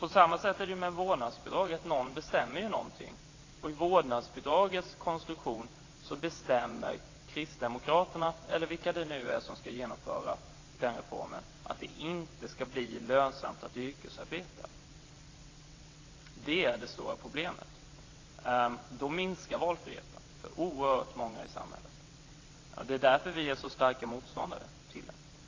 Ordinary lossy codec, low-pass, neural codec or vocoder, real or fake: AAC, 32 kbps; 7.2 kHz; vocoder, 22.05 kHz, 80 mel bands, WaveNeXt; fake